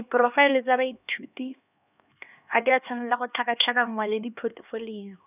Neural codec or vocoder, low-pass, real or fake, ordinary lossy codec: codec, 16 kHz, 2 kbps, X-Codec, HuBERT features, trained on LibriSpeech; 3.6 kHz; fake; none